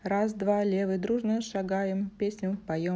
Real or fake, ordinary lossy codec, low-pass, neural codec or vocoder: real; none; none; none